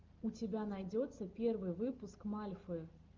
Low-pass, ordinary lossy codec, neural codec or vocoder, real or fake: 7.2 kHz; Opus, 32 kbps; none; real